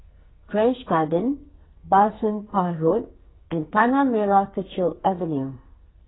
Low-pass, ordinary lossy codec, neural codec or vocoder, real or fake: 7.2 kHz; AAC, 16 kbps; codec, 44.1 kHz, 2.6 kbps, SNAC; fake